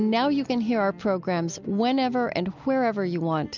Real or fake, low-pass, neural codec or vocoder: real; 7.2 kHz; none